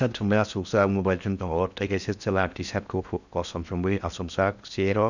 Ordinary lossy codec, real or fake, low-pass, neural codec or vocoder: none; fake; 7.2 kHz; codec, 16 kHz in and 24 kHz out, 0.6 kbps, FocalCodec, streaming, 4096 codes